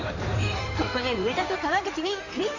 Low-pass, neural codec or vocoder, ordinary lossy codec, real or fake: 7.2 kHz; codec, 16 kHz in and 24 kHz out, 2.2 kbps, FireRedTTS-2 codec; none; fake